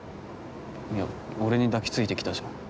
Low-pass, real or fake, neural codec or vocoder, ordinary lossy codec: none; real; none; none